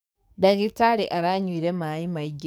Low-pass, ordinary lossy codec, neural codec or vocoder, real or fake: none; none; codec, 44.1 kHz, 7.8 kbps, DAC; fake